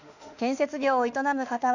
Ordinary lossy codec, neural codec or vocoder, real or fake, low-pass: none; autoencoder, 48 kHz, 32 numbers a frame, DAC-VAE, trained on Japanese speech; fake; 7.2 kHz